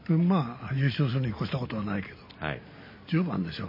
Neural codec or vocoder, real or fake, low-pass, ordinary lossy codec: none; real; 5.4 kHz; MP3, 24 kbps